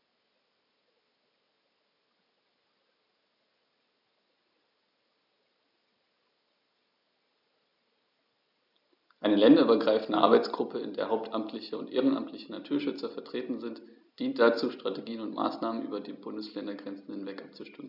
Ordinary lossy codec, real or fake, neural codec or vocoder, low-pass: none; real; none; 5.4 kHz